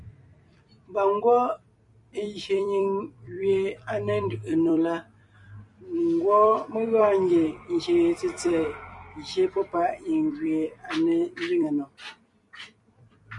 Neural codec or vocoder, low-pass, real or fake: vocoder, 44.1 kHz, 128 mel bands every 256 samples, BigVGAN v2; 10.8 kHz; fake